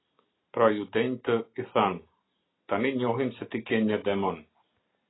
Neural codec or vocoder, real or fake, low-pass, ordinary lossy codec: none; real; 7.2 kHz; AAC, 16 kbps